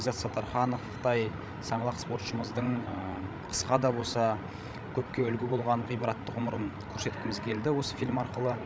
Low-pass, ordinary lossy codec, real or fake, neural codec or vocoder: none; none; fake; codec, 16 kHz, 16 kbps, FreqCodec, larger model